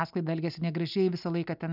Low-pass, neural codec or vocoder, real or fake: 5.4 kHz; none; real